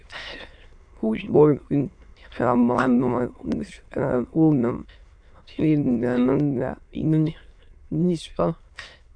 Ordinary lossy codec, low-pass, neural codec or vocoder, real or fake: AAC, 64 kbps; 9.9 kHz; autoencoder, 22.05 kHz, a latent of 192 numbers a frame, VITS, trained on many speakers; fake